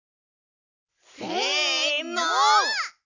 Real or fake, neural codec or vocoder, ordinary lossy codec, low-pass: real; none; none; 7.2 kHz